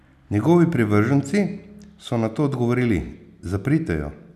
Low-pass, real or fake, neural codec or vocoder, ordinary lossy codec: 14.4 kHz; real; none; none